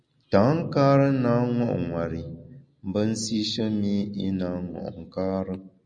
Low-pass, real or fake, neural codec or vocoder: 9.9 kHz; real; none